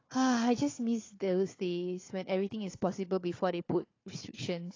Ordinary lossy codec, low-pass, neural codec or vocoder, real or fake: AAC, 32 kbps; 7.2 kHz; codec, 16 kHz, 4 kbps, FunCodec, trained on Chinese and English, 50 frames a second; fake